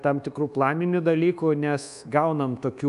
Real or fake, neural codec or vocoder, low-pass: fake; codec, 24 kHz, 1.2 kbps, DualCodec; 10.8 kHz